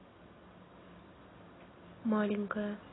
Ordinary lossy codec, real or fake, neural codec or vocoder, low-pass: AAC, 16 kbps; real; none; 7.2 kHz